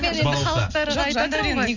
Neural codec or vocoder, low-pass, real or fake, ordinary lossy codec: none; 7.2 kHz; real; none